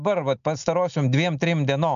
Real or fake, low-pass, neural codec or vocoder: real; 7.2 kHz; none